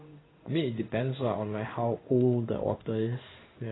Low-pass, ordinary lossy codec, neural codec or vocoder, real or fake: 7.2 kHz; AAC, 16 kbps; codec, 16 kHz in and 24 kHz out, 1 kbps, XY-Tokenizer; fake